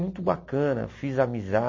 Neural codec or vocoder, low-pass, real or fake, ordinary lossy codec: none; 7.2 kHz; real; MP3, 32 kbps